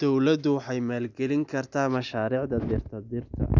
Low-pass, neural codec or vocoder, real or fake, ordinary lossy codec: 7.2 kHz; none; real; none